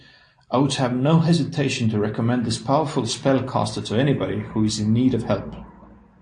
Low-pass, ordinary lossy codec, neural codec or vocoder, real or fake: 9.9 kHz; AAC, 64 kbps; none; real